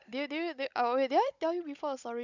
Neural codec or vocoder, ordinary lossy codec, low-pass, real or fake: codec, 16 kHz, 16 kbps, FunCodec, trained on Chinese and English, 50 frames a second; none; 7.2 kHz; fake